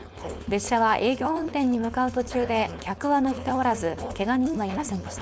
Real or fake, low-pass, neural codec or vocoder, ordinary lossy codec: fake; none; codec, 16 kHz, 4.8 kbps, FACodec; none